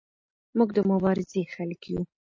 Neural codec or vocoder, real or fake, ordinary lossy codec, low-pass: none; real; MP3, 32 kbps; 7.2 kHz